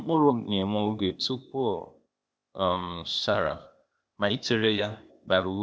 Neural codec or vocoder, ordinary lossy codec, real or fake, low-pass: codec, 16 kHz, 0.8 kbps, ZipCodec; none; fake; none